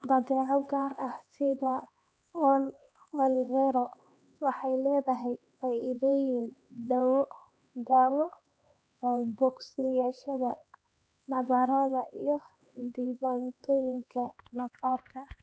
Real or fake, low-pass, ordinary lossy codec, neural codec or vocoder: fake; none; none; codec, 16 kHz, 2 kbps, X-Codec, HuBERT features, trained on LibriSpeech